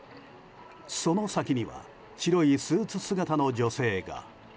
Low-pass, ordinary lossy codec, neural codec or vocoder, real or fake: none; none; none; real